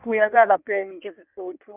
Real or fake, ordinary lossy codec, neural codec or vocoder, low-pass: fake; none; codec, 16 kHz in and 24 kHz out, 1.1 kbps, FireRedTTS-2 codec; 3.6 kHz